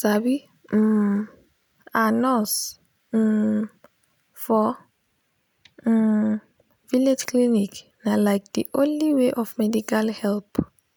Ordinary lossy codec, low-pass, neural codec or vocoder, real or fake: none; none; none; real